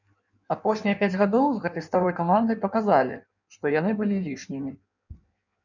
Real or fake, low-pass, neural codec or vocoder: fake; 7.2 kHz; codec, 16 kHz in and 24 kHz out, 1.1 kbps, FireRedTTS-2 codec